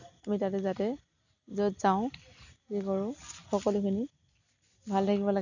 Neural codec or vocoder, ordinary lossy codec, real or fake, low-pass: none; none; real; 7.2 kHz